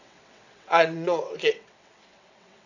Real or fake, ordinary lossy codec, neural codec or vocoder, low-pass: fake; none; vocoder, 22.05 kHz, 80 mel bands, WaveNeXt; 7.2 kHz